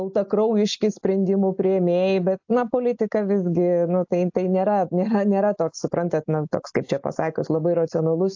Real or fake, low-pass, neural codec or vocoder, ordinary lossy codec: real; 7.2 kHz; none; AAC, 48 kbps